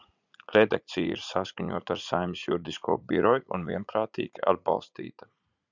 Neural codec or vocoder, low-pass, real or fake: vocoder, 44.1 kHz, 80 mel bands, Vocos; 7.2 kHz; fake